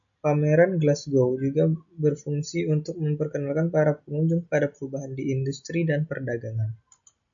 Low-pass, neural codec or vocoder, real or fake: 7.2 kHz; none; real